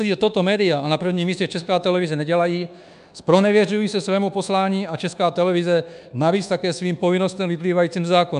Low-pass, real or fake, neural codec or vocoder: 10.8 kHz; fake; codec, 24 kHz, 1.2 kbps, DualCodec